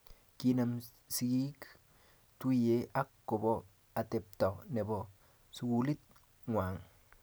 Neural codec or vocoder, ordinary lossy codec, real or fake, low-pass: none; none; real; none